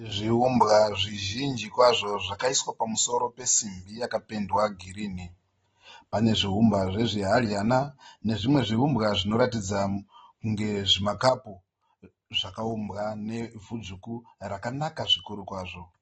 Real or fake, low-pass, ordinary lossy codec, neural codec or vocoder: real; 19.8 kHz; AAC, 24 kbps; none